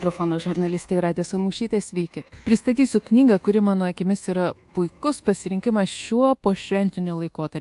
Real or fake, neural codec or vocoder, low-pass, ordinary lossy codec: fake; codec, 24 kHz, 1.2 kbps, DualCodec; 10.8 kHz; AAC, 64 kbps